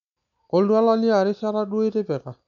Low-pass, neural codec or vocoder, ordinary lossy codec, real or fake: 7.2 kHz; none; none; real